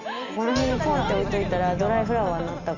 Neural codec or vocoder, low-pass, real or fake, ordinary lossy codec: none; 7.2 kHz; real; none